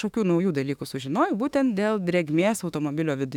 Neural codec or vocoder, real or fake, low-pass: autoencoder, 48 kHz, 32 numbers a frame, DAC-VAE, trained on Japanese speech; fake; 19.8 kHz